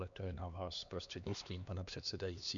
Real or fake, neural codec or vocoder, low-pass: fake; codec, 16 kHz, 2 kbps, X-Codec, HuBERT features, trained on LibriSpeech; 7.2 kHz